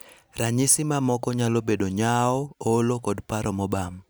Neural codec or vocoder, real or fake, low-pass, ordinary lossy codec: none; real; none; none